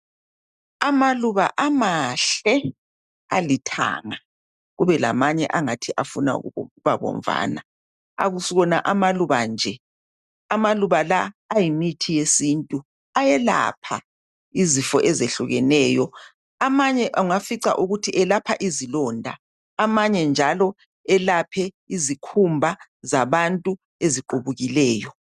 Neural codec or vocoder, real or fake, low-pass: none; real; 14.4 kHz